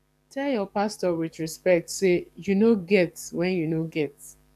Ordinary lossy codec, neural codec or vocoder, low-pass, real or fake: none; codec, 44.1 kHz, 7.8 kbps, DAC; 14.4 kHz; fake